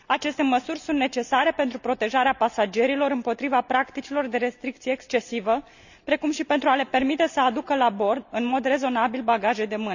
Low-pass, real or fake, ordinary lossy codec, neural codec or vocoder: 7.2 kHz; real; none; none